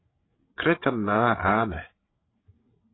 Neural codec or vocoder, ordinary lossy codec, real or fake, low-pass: codec, 16 kHz, 8 kbps, FreqCodec, larger model; AAC, 16 kbps; fake; 7.2 kHz